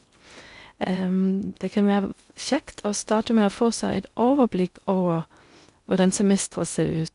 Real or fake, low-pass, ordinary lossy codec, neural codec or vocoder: fake; 10.8 kHz; none; codec, 16 kHz in and 24 kHz out, 0.6 kbps, FocalCodec, streaming, 2048 codes